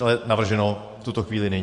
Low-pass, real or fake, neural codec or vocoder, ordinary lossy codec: 10.8 kHz; fake; vocoder, 24 kHz, 100 mel bands, Vocos; MP3, 64 kbps